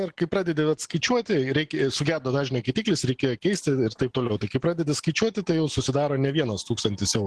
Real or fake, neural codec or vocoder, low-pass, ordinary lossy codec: real; none; 10.8 kHz; Opus, 16 kbps